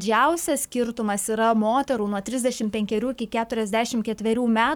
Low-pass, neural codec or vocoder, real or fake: 19.8 kHz; codec, 44.1 kHz, 7.8 kbps, Pupu-Codec; fake